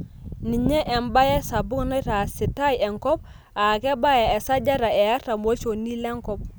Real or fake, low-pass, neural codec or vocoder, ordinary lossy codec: real; none; none; none